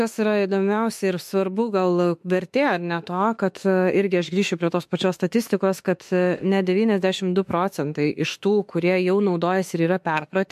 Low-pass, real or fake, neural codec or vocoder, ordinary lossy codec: 14.4 kHz; fake; autoencoder, 48 kHz, 32 numbers a frame, DAC-VAE, trained on Japanese speech; MP3, 64 kbps